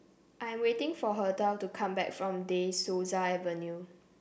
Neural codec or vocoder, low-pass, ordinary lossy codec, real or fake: none; none; none; real